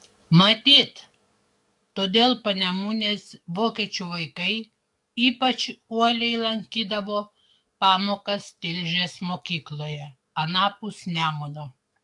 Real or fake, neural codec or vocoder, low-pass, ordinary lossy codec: fake; codec, 44.1 kHz, 7.8 kbps, DAC; 10.8 kHz; AAC, 64 kbps